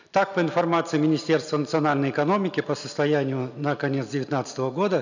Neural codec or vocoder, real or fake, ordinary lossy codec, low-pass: none; real; none; 7.2 kHz